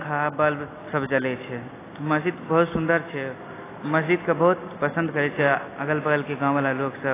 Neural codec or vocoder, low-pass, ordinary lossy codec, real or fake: none; 3.6 kHz; AAC, 16 kbps; real